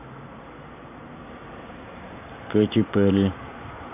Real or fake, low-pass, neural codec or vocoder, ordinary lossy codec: fake; 3.6 kHz; vocoder, 44.1 kHz, 128 mel bands every 512 samples, BigVGAN v2; none